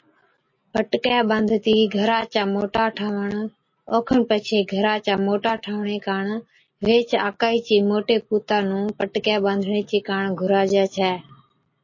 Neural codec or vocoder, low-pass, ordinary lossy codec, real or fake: none; 7.2 kHz; MP3, 32 kbps; real